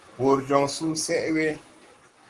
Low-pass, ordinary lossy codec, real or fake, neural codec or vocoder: 10.8 kHz; Opus, 24 kbps; fake; codec, 44.1 kHz, 7.8 kbps, Pupu-Codec